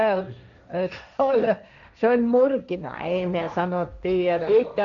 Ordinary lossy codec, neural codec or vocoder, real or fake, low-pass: none; codec, 16 kHz, 1.1 kbps, Voila-Tokenizer; fake; 7.2 kHz